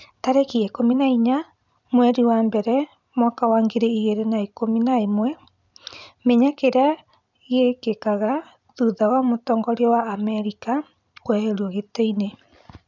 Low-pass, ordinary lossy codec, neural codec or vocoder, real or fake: 7.2 kHz; none; vocoder, 44.1 kHz, 128 mel bands every 256 samples, BigVGAN v2; fake